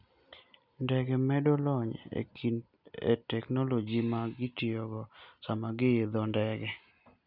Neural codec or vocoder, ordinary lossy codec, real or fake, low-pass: none; none; real; 5.4 kHz